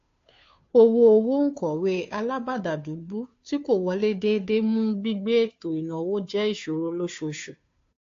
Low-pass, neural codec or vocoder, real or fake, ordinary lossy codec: 7.2 kHz; codec, 16 kHz, 2 kbps, FunCodec, trained on Chinese and English, 25 frames a second; fake; AAC, 48 kbps